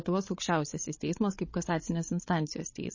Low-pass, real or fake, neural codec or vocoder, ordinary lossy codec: 7.2 kHz; fake; codec, 16 kHz, 16 kbps, FreqCodec, larger model; MP3, 32 kbps